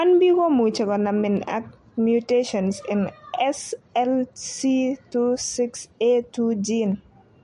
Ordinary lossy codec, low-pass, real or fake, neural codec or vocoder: MP3, 48 kbps; 14.4 kHz; real; none